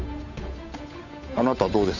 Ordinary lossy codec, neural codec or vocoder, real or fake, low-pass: none; codec, 16 kHz, 8 kbps, FunCodec, trained on Chinese and English, 25 frames a second; fake; 7.2 kHz